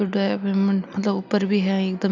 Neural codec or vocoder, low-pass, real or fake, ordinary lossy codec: none; 7.2 kHz; real; none